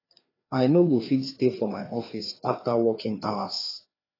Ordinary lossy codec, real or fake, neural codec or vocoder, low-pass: AAC, 24 kbps; fake; codec, 16 kHz, 2 kbps, FreqCodec, larger model; 5.4 kHz